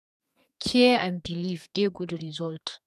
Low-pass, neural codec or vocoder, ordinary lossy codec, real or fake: 14.4 kHz; codec, 32 kHz, 1.9 kbps, SNAC; none; fake